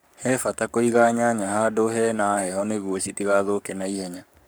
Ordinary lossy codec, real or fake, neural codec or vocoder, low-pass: none; fake; codec, 44.1 kHz, 7.8 kbps, Pupu-Codec; none